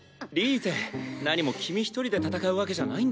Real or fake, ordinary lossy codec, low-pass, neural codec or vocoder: real; none; none; none